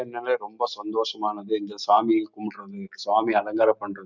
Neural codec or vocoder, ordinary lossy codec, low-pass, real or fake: none; none; 7.2 kHz; real